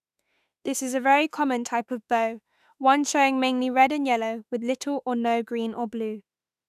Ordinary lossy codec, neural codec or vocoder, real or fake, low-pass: none; autoencoder, 48 kHz, 32 numbers a frame, DAC-VAE, trained on Japanese speech; fake; 14.4 kHz